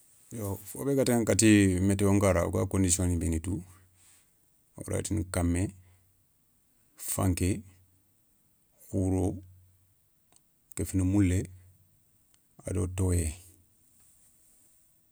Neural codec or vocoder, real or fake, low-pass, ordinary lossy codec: none; real; none; none